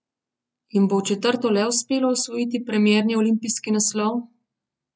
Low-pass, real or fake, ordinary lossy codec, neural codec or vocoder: none; real; none; none